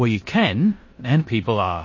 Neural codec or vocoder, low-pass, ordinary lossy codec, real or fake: codec, 16 kHz in and 24 kHz out, 0.9 kbps, LongCat-Audio-Codec, four codebook decoder; 7.2 kHz; MP3, 32 kbps; fake